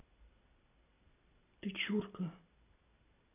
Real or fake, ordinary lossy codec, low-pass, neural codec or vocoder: real; none; 3.6 kHz; none